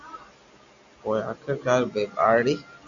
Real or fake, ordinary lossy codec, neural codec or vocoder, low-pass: real; AAC, 64 kbps; none; 7.2 kHz